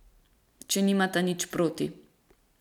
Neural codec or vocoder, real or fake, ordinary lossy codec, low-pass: none; real; none; 19.8 kHz